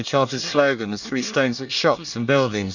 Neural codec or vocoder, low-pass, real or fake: codec, 24 kHz, 1 kbps, SNAC; 7.2 kHz; fake